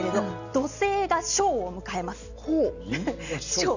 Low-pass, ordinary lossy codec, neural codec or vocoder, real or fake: 7.2 kHz; none; none; real